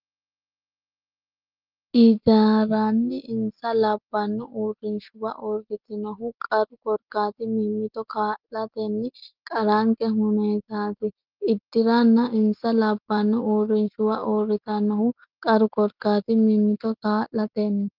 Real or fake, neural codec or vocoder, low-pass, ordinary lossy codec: real; none; 5.4 kHz; Opus, 24 kbps